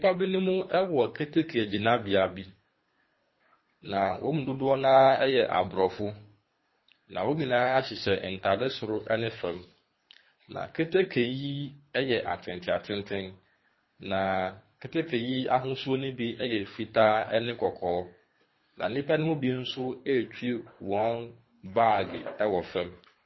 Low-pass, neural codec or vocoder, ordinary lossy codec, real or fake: 7.2 kHz; codec, 24 kHz, 3 kbps, HILCodec; MP3, 24 kbps; fake